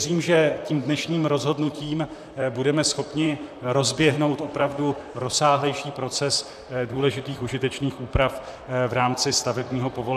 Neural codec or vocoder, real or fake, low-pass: vocoder, 44.1 kHz, 128 mel bands, Pupu-Vocoder; fake; 14.4 kHz